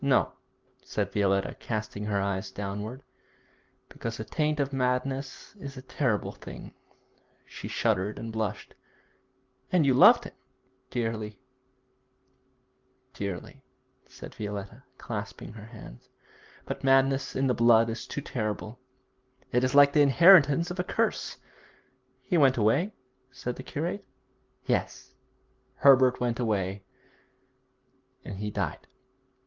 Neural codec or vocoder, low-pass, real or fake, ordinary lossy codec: none; 7.2 kHz; real; Opus, 32 kbps